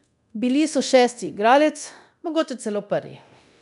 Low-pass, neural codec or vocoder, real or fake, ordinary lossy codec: 10.8 kHz; codec, 24 kHz, 0.9 kbps, DualCodec; fake; none